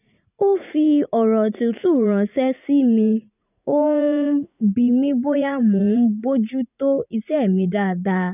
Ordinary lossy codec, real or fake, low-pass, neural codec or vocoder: none; fake; 3.6 kHz; vocoder, 44.1 kHz, 80 mel bands, Vocos